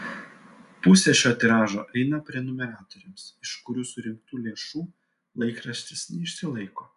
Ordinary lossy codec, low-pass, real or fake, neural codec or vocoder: MP3, 96 kbps; 10.8 kHz; real; none